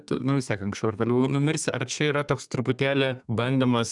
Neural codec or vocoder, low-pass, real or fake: codec, 32 kHz, 1.9 kbps, SNAC; 10.8 kHz; fake